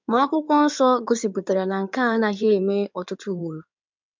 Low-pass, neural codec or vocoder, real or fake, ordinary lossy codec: 7.2 kHz; codec, 16 kHz in and 24 kHz out, 2.2 kbps, FireRedTTS-2 codec; fake; MP3, 64 kbps